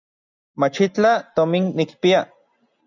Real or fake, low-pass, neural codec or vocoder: real; 7.2 kHz; none